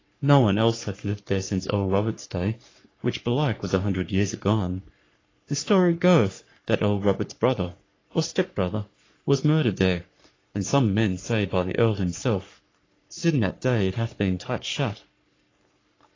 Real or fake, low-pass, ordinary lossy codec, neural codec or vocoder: fake; 7.2 kHz; AAC, 32 kbps; codec, 44.1 kHz, 3.4 kbps, Pupu-Codec